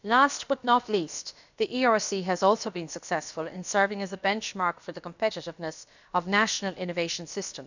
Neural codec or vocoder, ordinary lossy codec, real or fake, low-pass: codec, 16 kHz, about 1 kbps, DyCAST, with the encoder's durations; none; fake; 7.2 kHz